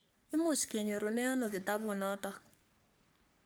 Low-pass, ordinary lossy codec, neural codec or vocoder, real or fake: none; none; codec, 44.1 kHz, 3.4 kbps, Pupu-Codec; fake